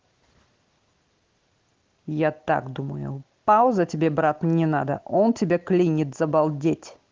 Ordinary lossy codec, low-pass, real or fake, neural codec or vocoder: Opus, 16 kbps; 7.2 kHz; real; none